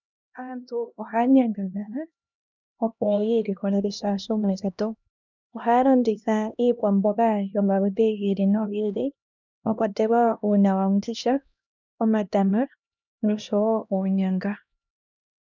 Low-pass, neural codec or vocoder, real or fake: 7.2 kHz; codec, 16 kHz, 1 kbps, X-Codec, HuBERT features, trained on LibriSpeech; fake